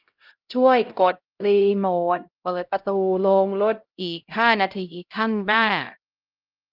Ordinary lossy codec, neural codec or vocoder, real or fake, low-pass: Opus, 32 kbps; codec, 16 kHz, 0.5 kbps, X-Codec, HuBERT features, trained on LibriSpeech; fake; 5.4 kHz